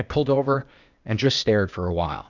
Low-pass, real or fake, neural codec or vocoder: 7.2 kHz; fake; codec, 16 kHz, 0.8 kbps, ZipCodec